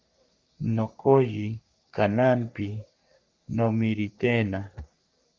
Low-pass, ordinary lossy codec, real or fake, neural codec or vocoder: 7.2 kHz; Opus, 32 kbps; fake; codec, 44.1 kHz, 3.4 kbps, Pupu-Codec